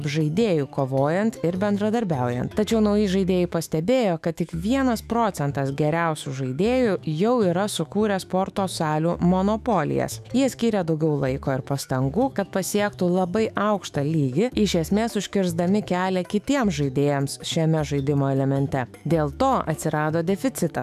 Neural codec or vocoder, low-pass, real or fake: autoencoder, 48 kHz, 128 numbers a frame, DAC-VAE, trained on Japanese speech; 14.4 kHz; fake